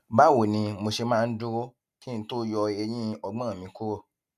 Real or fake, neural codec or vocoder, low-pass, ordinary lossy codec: real; none; 14.4 kHz; none